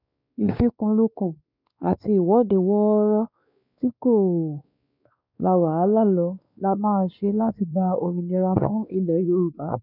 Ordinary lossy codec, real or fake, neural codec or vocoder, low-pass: none; fake; codec, 16 kHz, 2 kbps, X-Codec, WavLM features, trained on Multilingual LibriSpeech; 5.4 kHz